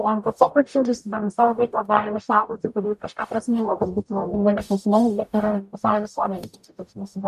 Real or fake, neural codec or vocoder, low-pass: fake; codec, 44.1 kHz, 0.9 kbps, DAC; 14.4 kHz